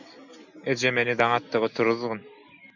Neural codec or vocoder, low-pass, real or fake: none; 7.2 kHz; real